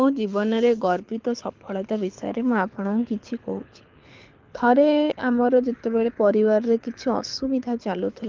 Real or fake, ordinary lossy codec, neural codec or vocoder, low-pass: fake; Opus, 32 kbps; codec, 24 kHz, 6 kbps, HILCodec; 7.2 kHz